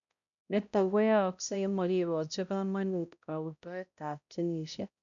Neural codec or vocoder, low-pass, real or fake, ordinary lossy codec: codec, 16 kHz, 0.5 kbps, X-Codec, HuBERT features, trained on balanced general audio; 7.2 kHz; fake; none